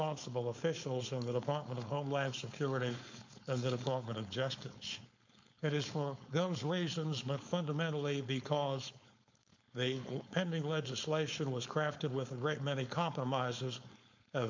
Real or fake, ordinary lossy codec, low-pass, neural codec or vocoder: fake; MP3, 48 kbps; 7.2 kHz; codec, 16 kHz, 4.8 kbps, FACodec